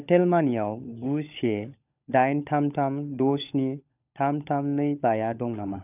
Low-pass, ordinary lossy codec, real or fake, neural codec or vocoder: 3.6 kHz; none; fake; codec, 16 kHz, 16 kbps, FunCodec, trained on LibriTTS, 50 frames a second